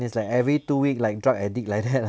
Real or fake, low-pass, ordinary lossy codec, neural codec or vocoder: real; none; none; none